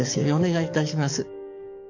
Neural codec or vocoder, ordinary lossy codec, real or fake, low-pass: codec, 44.1 kHz, 7.8 kbps, DAC; none; fake; 7.2 kHz